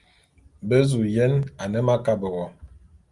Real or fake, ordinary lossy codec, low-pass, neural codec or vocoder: real; Opus, 32 kbps; 10.8 kHz; none